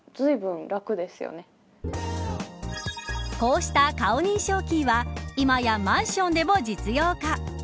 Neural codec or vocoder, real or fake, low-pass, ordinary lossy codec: none; real; none; none